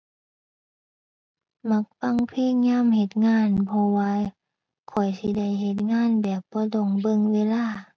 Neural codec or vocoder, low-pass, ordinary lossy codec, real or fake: none; none; none; real